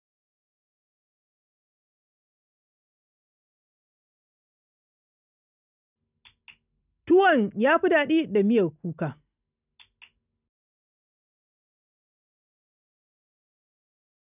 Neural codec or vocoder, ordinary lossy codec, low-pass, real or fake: none; none; 3.6 kHz; real